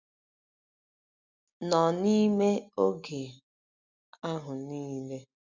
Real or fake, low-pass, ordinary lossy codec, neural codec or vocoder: real; 7.2 kHz; Opus, 64 kbps; none